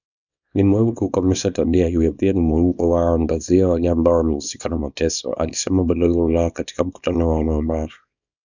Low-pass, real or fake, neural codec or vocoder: 7.2 kHz; fake; codec, 24 kHz, 0.9 kbps, WavTokenizer, small release